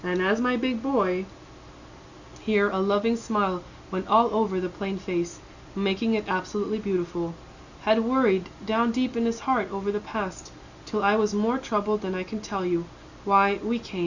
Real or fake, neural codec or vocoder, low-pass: real; none; 7.2 kHz